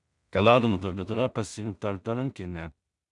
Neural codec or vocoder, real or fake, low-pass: codec, 16 kHz in and 24 kHz out, 0.4 kbps, LongCat-Audio-Codec, two codebook decoder; fake; 10.8 kHz